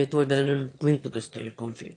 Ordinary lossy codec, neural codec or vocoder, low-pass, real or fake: AAC, 48 kbps; autoencoder, 22.05 kHz, a latent of 192 numbers a frame, VITS, trained on one speaker; 9.9 kHz; fake